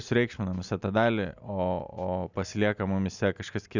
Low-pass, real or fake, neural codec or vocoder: 7.2 kHz; real; none